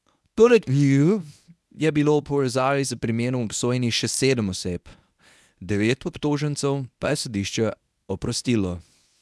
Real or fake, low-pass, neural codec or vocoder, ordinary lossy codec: fake; none; codec, 24 kHz, 0.9 kbps, WavTokenizer, medium speech release version 1; none